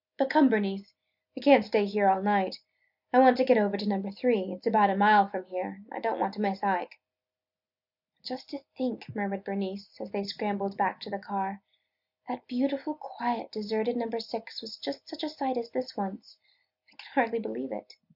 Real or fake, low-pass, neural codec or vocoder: real; 5.4 kHz; none